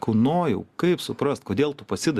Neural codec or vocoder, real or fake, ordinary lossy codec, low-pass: none; real; Opus, 64 kbps; 14.4 kHz